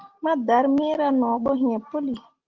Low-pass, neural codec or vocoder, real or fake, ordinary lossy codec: 7.2 kHz; none; real; Opus, 32 kbps